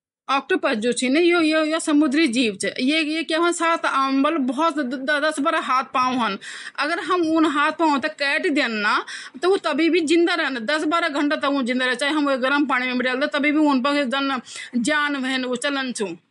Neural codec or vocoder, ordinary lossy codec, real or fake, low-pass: none; MP3, 64 kbps; real; 10.8 kHz